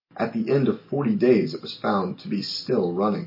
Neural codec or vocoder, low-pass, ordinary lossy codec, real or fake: none; 5.4 kHz; MP3, 24 kbps; real